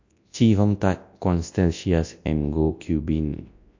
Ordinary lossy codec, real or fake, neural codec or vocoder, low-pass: AAC, 48 kbps; fake; codec, 24 kHz, 0.9 kbps, WavTokenizer, large speech release; 7.2 kHz